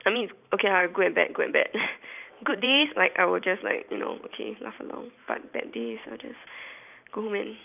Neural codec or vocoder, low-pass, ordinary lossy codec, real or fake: vocoder, 44.1 kHz, 128 mel bands every 512 samples, BigVGAN v2; 3.6 kHz; none; fake